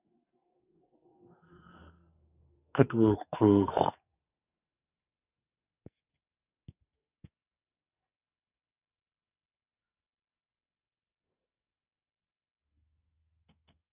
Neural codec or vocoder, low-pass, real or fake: codec, 44.1 kHz, 2.6 kbps, SNAC; 3.6 kHz; fake